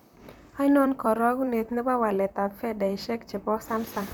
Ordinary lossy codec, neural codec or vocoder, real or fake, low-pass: none; vocoder, 44.1 kHz, 128 mel bands, Pupu-Vocoder; fake; none